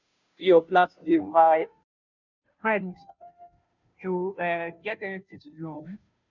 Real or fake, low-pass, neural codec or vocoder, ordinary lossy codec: fake; 7.2 kHz; codec, 16 kHz, 0.5 kbps, FunCodec, trained on Chinese and English, 25 frames a second; none